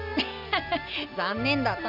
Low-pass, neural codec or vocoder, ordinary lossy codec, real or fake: 5.4 kHz; none; none; real